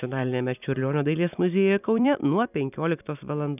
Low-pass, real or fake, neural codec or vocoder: 3.6 kHz; fake; vocoder, 44.1 kHz, 128 mel bands every 256 samples, BigVGAN v2